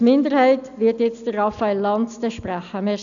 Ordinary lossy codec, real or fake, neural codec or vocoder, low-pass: none; real; none; 7.2 kHz